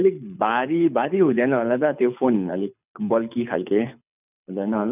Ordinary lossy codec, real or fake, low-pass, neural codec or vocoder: none; fake; 3.6 kHz; codec, 24 kHz, 6 kbps, HILCodec